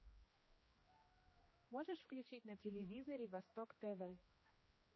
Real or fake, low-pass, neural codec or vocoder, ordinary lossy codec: fake; 7.2 kHz; codec, 16 kHz, 1 kbps, X-Codec, HuBERT features, trained on general audio; MP3, 24 kbps